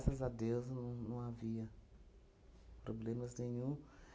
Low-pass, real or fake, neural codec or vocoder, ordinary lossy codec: none; real; none; none